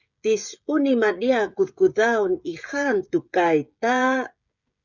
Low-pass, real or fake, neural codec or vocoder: 7.2 kHz; fake; codec, 16 kHz, 16 kbps, FreqCodec, smaller model